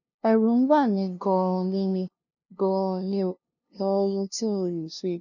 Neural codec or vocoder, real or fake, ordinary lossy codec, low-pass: codec, 16 kHz, 0.5 kbps, FunCodec, trained on LibriTTS, 25 frames a second; fake; none; 7.2 kHz